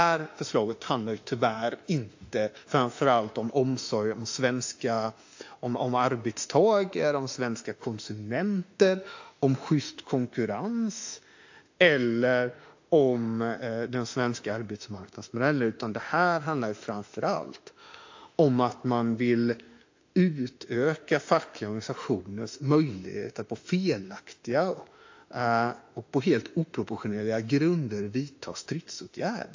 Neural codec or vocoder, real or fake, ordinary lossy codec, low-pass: autoencoder, 48 kHz, 32 numbers a frame, DAC-VAE, trained on Japanese speech; fake; AAC, 48 kbps; 7.2 kHz